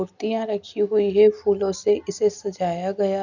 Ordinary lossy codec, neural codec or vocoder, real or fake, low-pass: none; none; real; 7.2 kHz